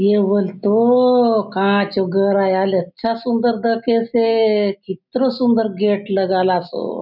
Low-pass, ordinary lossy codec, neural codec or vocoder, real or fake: 5.4 kHz; none; none; real